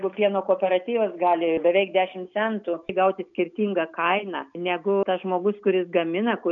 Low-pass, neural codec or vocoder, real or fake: 7.2 kHz; none; real